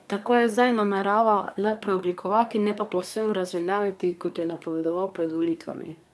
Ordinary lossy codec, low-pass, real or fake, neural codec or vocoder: none; none; fake; codec, 24 kHz, 1 kbps, SNAC